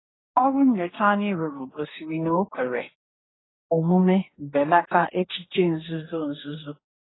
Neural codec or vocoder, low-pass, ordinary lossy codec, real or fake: codec, 44.1 kHz, 2.6 kbps, DAC; 7.2 kHz; AAC, 16 kbps; fake